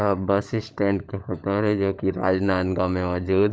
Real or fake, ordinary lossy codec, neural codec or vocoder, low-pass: fake; none; codec, 16 kHz, 16 kbps, FunCodec, trained on LibriTTS, 50 frames a second; none